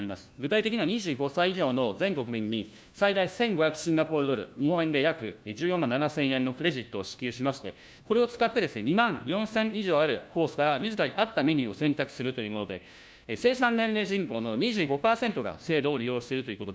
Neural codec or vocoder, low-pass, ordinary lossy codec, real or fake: codec, 16 kHz, 1 kbps, FunCodec, trained on LibriTTS, 50 frames a second; none; none; fake